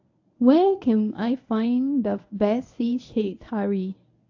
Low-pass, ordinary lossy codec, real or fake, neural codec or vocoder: 7.2 kHz; AAC, 48 kbps; fake; codec, 24 kHz, 0.9 kbps, WavTokenizer, medium speech release version 1